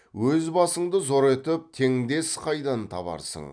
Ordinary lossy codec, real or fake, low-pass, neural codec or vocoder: none; real; 9.9 kHz; none